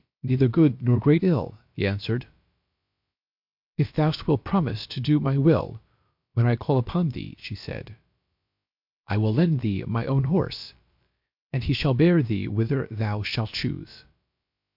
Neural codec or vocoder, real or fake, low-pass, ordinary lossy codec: codec, 16 kHz, about 1 kbps, DyCAST, with the encoder's durations; fake; 5.4 kHz; MP3, 48 kbps